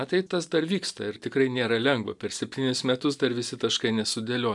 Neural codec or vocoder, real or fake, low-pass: none; real; 10.8 kHz